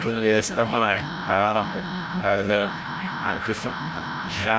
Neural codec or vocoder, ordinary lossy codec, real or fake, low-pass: codec, 16 kHz, 0.5 kbps, FreqCodec, larger model; none; fake; none